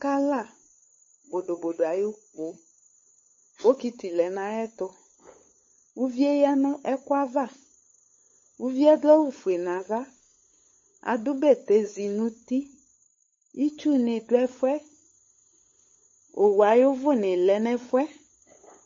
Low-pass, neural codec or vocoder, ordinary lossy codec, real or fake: 7.2 kHz; codec, 16 kHz, 8 kbps, FunCodec, trained on LibriTTS, 25 frames a second; MP3, 32 kbps; fake